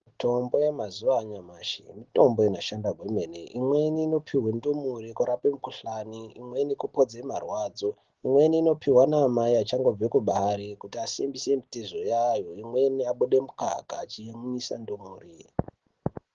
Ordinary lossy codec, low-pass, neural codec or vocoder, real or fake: Opus, 16 kbps; 7.2 kHz; none; real